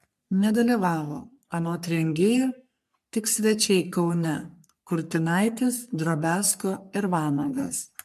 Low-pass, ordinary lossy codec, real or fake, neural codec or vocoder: 14.4 kHz; MP3, 96 kbps; fake; codec, 44.1 kHz, 3.4 kbps, Pupu-Codec